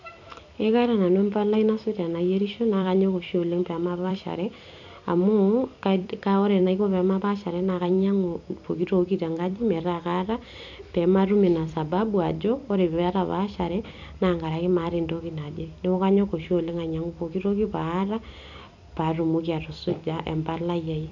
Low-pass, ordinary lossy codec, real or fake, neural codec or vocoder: 7.2 kHz; none; fake; vocoder, 44.1 kHz, 128 mel bands every 256 samples, BigVGAN v2